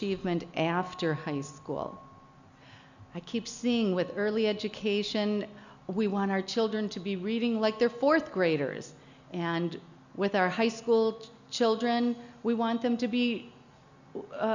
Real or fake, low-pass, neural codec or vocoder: real; 7.2 kHz; none